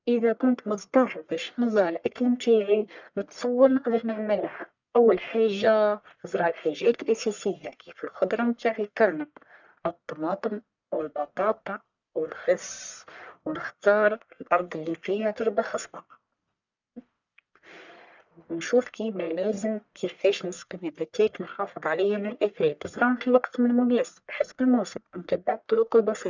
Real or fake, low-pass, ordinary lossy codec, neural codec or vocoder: fake; 7.2 kHz; none; codec, 44.1 kHz, 1.7 kbps, Pupu-Codec